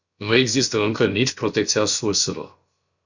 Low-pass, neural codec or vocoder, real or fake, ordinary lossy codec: 7.2 kHz; codec, 16 kHz, about 1 kbps, DyCAST, with the encoder's durations; fake; Opus, 64 kbps